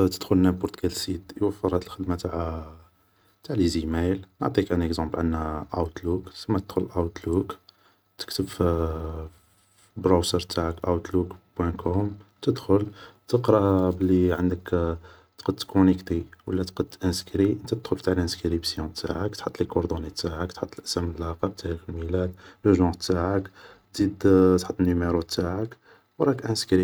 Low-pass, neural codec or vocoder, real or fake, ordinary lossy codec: none; none; real; none